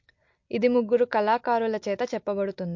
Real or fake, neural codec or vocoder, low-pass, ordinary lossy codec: real; none; 7.2 kHz; MP3, 48 kbps